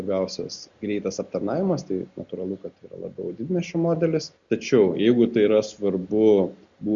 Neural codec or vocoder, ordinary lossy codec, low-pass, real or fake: none; Opus, 64 kbps; 7.2 kHz; real